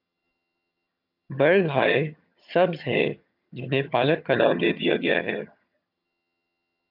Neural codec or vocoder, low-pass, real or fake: vocoder, 22.05 kHz, 80 mel bands, HiFi-GAN; 5.4 kHz; fake